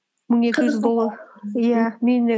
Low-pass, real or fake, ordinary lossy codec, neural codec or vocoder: none; real; none; none